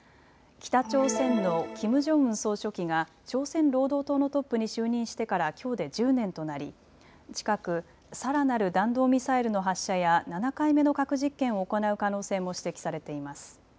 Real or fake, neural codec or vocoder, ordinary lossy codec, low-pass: real; none; none; none